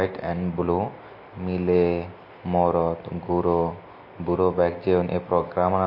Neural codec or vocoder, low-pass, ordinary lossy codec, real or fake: none; 5.4 kHz; MP3, 32 kbps; real